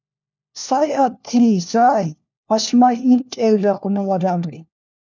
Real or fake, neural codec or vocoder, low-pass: fake; codec, 16 kHz, 1 kbps, FunCodec, trained on LibriTTS, 50 frames a second; 7.2 kHz